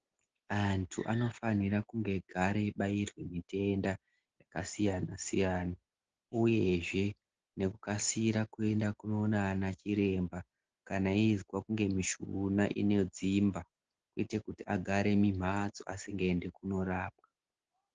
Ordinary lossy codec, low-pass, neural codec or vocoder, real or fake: Opus, 16 kbps; 7.2 kHz; none; real